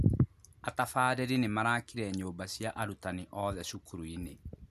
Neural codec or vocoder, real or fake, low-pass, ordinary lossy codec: none; real; 14.4 kHz; none